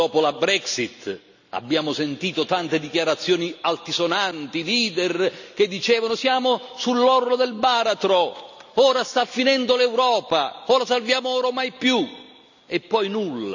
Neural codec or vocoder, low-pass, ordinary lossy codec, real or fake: none; 7.2 kHz; none; real